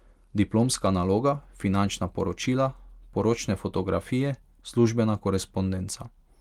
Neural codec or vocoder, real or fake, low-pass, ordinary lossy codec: none; real; 19.8 kHz; Opus, 24 kbps